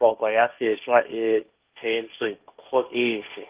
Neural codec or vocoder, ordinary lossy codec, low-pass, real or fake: codec, 16 kHz, 1.1 kbps, Voila-Tokenizer; Opus, 32 kbps; 3.6 kHz; fake